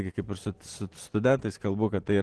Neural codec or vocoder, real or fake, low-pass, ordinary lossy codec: none; real; 10.8 kHz; Opus, 16 kbps